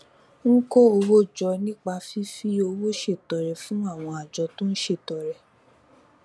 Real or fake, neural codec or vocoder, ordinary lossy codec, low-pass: real; none; none; none